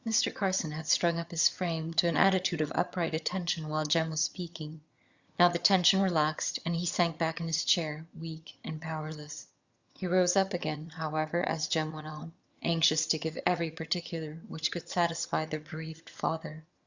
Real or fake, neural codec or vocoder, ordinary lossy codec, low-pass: fake; vocoder, 22.05 kHz, 80 mel bands, HiFi-GAN; Opus, 64 kbps; 7.2 kHz